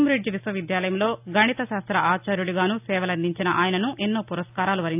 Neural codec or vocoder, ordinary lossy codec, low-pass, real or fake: none; AAC, 32 kbps; 3.6 kHz; real